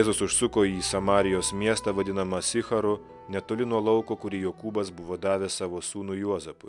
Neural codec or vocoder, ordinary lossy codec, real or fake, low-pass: none; MP3, 96 kbps; real; 10.8 kHz